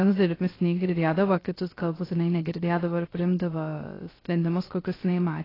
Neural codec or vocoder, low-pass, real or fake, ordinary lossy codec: codec, 16 kHz, 0.3 kbps, FocalCodec; 5.4 kHz; fake; AAC, 24 kbps